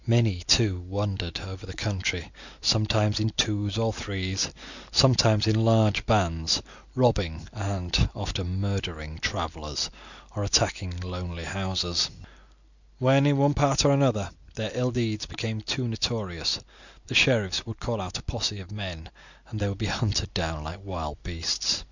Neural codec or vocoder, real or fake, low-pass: none; real; 7.2 kHz